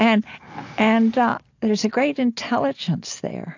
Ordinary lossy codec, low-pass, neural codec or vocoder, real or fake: AAC, 48 kbps; 7.2 kHz; none; real